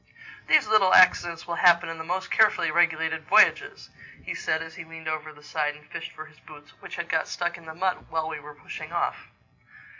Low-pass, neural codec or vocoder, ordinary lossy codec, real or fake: 7.2 kHz; none; MP3, 64 kbps; real